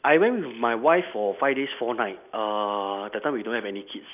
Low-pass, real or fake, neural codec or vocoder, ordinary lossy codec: 3.6 kHz; real; none; none